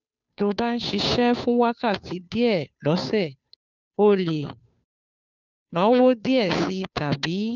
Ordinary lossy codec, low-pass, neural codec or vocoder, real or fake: none; 7.2 kHz; codec, 16 kHz, 2 kbps, FunCodec, trained on Chinese and English, 25 frames a second; fake